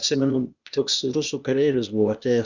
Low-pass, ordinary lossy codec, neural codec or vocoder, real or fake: 7.2 kHz; Opus, 64 kbps; codec, 16 kHz, 0.8 kbps, ZipCodec; fake